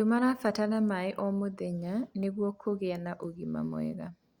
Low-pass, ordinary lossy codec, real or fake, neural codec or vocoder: 19.8 kHz; Opus, 64 kbps; real; none